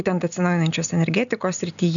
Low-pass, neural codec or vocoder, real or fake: 7.2 kHz; none; real